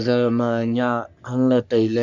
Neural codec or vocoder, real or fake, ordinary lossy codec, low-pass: codec, 44.1 kHz, 3.4 kbps, Pupu-Codec; fake; none; 7.2 kHz